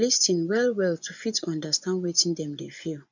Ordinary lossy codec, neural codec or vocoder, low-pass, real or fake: none; vocoder, 24 kHz, 100 mel bands, Vocos; 7.2 kHz; fake